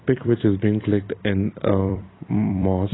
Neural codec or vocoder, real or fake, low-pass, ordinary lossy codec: vocoder, 44.1 kHz, 128 mel bands every 256 samples, BigVGAN v2; fake; 7.2 kHz; AAC, 16 kbps